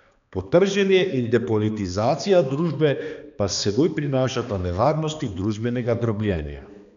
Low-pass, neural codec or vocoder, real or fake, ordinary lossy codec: 7.2 kHz; codec, 16 kHz, 2 kbps, X-Codec, HuBERT features, trained on balanced general audio; fake; none